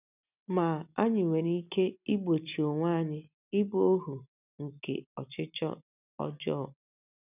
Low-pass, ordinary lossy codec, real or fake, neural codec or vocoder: 3.6 kHz; none; real; none